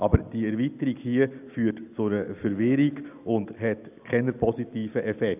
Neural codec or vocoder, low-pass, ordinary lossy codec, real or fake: none; 3.6 kHz; none; real